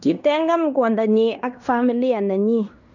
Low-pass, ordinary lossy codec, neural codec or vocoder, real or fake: 7.2 kHz; none; codec, 16 kHz in and 24 kHz out, 0.9 kbps, LongCat-Audio-Codec, fine tuned four codebook decoder; fake